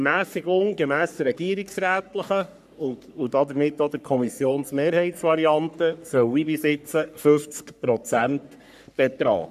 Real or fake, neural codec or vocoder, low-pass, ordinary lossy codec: fake; codec, 44.1 kHz, 3.4 kbps, Pupu-Codec; 14.4 kHz; none